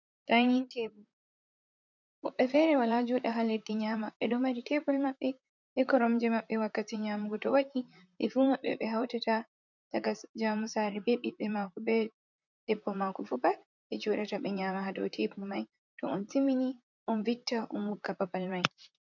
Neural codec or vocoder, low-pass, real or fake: vocoder, 44.1 kHz, 128 mel bands, Pupu-Vocoder; 7.2 kHz; fake